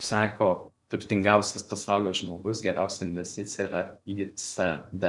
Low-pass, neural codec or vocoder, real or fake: 10.8 kHz; codec, 16 kHz in and 24 kHz out, 0.8 kbps, FocalCodec, streaming, 65536 codes; fake